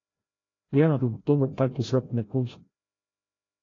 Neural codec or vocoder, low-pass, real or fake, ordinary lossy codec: codec, 16 kHz, 0.5 kbps, FreqCodec, larger model; 7.2 kHz; fake; AAC, 32 kbps